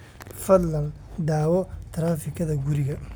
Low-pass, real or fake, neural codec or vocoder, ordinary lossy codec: none; real; none; none